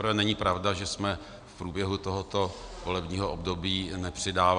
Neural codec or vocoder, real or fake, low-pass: none; real; 9.9 kHz